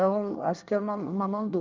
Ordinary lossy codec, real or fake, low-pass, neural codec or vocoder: Opus, 32 kbps; fake; 7.2 kHz; codec, 24 kHz, 1 kbps, SNAC